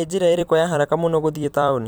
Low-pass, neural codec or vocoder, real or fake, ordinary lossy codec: none; vocoder, 44.1 kHz, 128 mel bands every 256 samples, BigVGAN v2; fake; none